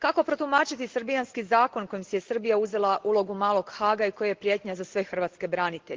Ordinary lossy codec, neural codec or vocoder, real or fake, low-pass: Opus, 16 kbps; none; real; 7.2 kHz